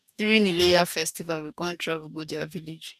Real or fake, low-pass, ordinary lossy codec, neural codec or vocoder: fake; 14.4 kHz; none; codec, 44.1 kHz, 2.6 kbps, DAC